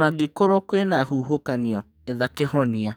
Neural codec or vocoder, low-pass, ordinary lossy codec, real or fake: codec, 44.1 kHz, 2.6 kbps, SNAC; none; none; fake